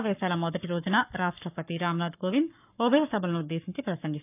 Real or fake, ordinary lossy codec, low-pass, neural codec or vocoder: fake; none; 3.6 kHz; codec, 44.1 kHz, 7.8 kbps, Pupu-Codec